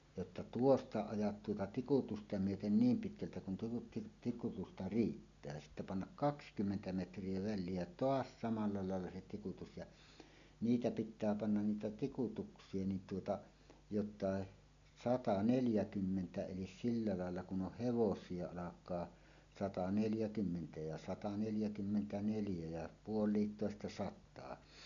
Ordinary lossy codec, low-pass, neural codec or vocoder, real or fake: none; 7.2 kHz; none; real